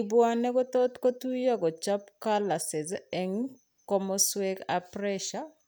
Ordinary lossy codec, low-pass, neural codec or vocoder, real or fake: none; none; none; real